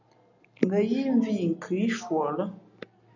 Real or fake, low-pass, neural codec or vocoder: real; 7.2 kHz; none